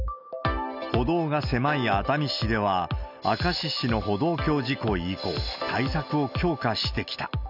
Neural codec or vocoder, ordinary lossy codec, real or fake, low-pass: none; none; real; 5.4 kHz